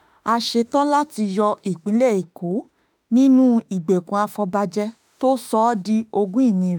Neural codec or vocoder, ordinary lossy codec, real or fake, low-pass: autoencoder, 48 kHz, 32 numbers a frame, DAC-VAE, trained on Japanese speech; none; fake; none